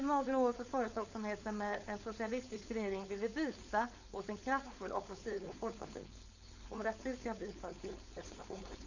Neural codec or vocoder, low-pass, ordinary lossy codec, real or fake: codec, 16 kHz, 4.8 kbps, FACodec; 7.2 kHz; none; fake